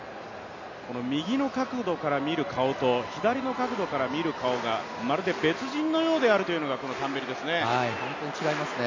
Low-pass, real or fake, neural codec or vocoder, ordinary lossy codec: 7.2 kHz; real; none; MP3, 64 kbps